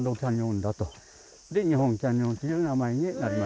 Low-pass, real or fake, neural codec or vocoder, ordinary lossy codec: none; real; none; none